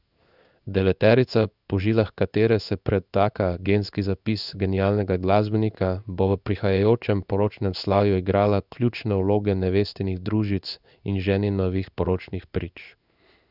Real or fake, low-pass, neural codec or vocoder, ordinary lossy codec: fake; 5.4 kHz; codec, 16 kHz in and 24 kHz out, 1 kbps, XY-Tokenizer; none